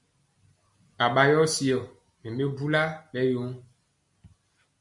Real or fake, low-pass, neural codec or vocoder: real; 10.8 kHz; none